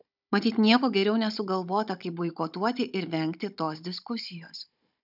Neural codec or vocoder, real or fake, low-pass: codec, 16 kHz, 16 kbps, FunCodec, trained on Chinese and English, 50 frames a second; fake; 5.4 kHz